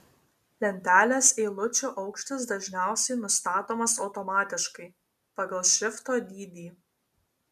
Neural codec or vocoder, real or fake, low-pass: none; real; 14.4 kHz